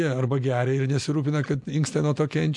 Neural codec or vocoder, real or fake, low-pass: none; real; 10.8 kHz